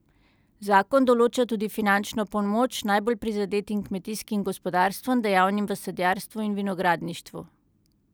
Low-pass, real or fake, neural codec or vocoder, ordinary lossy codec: none; real; none; none